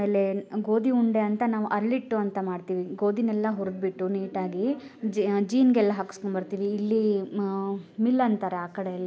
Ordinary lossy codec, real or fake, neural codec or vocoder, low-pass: none; real; none; none